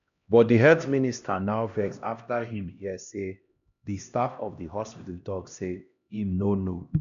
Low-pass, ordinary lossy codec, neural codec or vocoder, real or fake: 7.2 kHz; none; codec, 16 kHz, 1 kbps, X-Codec, HuBERT features, trained on LibriSpeech; fake